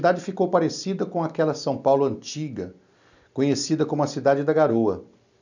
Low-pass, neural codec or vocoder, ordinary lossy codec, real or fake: 7.2 kHz; none; none; real